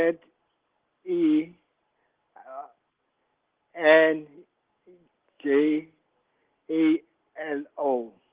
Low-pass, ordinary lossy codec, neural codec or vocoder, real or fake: 3.6 kHz; Opus, 16 kbps; none; real